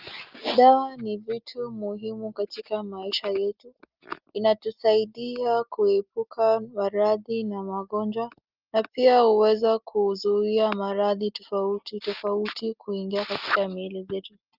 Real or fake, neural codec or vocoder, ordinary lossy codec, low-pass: real; none; Opus, 24 kbps; 5.4 kHz